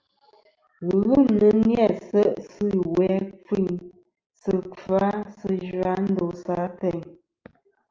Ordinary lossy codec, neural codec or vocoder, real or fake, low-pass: Opus, 24 kbps; none; real; 7.2 kHz